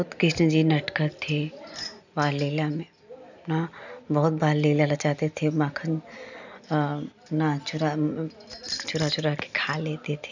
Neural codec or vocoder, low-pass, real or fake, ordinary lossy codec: none; 7.2 kHz; real; none